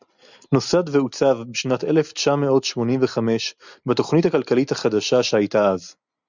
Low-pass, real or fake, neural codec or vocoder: 7.2 kHz; real; none